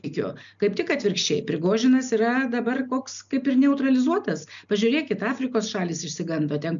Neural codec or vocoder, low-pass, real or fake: none; 7.2 kHz; real